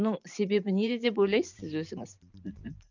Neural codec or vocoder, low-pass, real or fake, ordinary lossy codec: vocoder, 44.1 kHz, 128 mel bands every 512 samples, BigVGAN v2; 7.2 kHz; fake; none